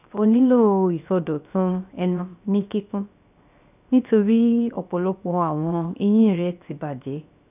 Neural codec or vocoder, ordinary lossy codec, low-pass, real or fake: codec, 16 kHz, 0.7 kbps, FocalCodec; none; 3.6 kHz; fake